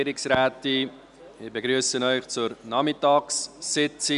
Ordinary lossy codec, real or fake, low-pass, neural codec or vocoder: none; real; 10.8 kHz; none